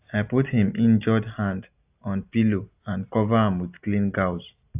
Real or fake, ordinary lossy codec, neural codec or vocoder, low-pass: real; AAC, 32 kbps; none; 3.6 kHz